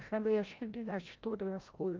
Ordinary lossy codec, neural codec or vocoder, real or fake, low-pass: Opus, 24 kbps; codec, 16 kHz, 0.5 kbps, FreqCodec, larger model; fake; 7.2 kHz